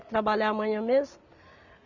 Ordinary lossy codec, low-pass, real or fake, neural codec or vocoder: none; 7.2 kHz; real; none